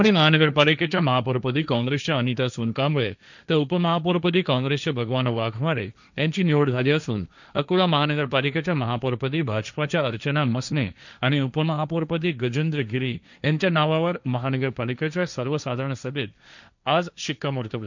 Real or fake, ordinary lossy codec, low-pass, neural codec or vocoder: fake; none; 7.2 kHz; codec, 16 kHz, 1.1 kbps, Voila-Tokenizer